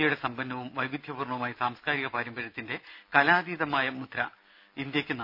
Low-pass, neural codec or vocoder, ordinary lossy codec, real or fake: 5.4 kHz; none; none; real